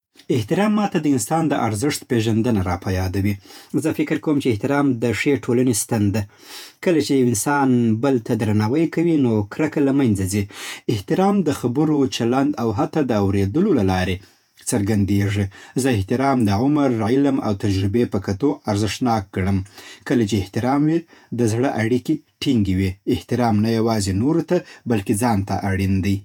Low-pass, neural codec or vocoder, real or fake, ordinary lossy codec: 19.8 kHz; vocoder, 48 kHz, 128 mel bands, Vocos; fake; none